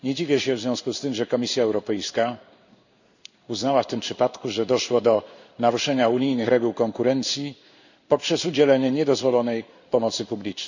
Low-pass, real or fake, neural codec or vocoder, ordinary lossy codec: 7.2 kHz; fake; codec, 16 kHz in and 24 kHz out, 1 kbps, XY-Tokenizer; none